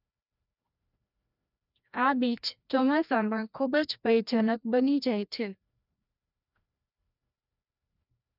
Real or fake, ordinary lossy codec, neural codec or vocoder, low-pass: fake; none; codec, 16 kHz, 1 kbps, FreqCodec, larger model; 5.4 kHz